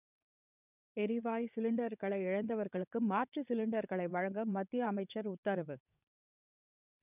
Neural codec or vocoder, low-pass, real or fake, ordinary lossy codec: vocoder, 22.05 kHz, 80 mel bands, WaveNeXt; 3.6 kHz; fake; none